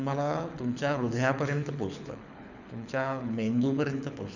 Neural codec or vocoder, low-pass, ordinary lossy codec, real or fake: codec, 24 kHz, 6 kbps, HILCodec; 7.2 kHz; none; fake